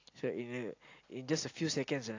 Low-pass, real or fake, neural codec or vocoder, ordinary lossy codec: 7.2 kHz; real; none; AAC, 48 kbps